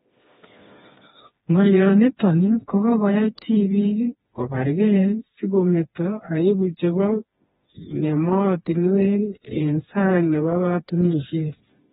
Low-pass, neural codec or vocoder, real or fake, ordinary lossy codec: 7.2 kHz; codec, 16 kHz, 2 kbps, FreqCodec, smaller model; fake; AAC, 16 kbps